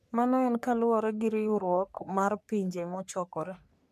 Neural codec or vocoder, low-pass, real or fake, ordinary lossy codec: codec, 44.1 kHz, 3.4 kbps, Pupu-Codec; 14.4 kHz; fake; MP3, 96 kbps